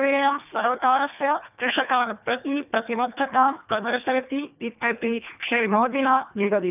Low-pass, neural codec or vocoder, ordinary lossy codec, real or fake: 3.6 kHz; codec, 24 kHz, 1.5 kbps, HILCodec; none; fake